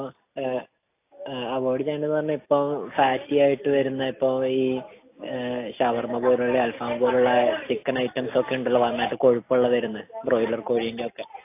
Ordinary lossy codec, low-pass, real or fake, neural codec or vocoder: AAC, 24 kbps; 3.6 kHz; real; none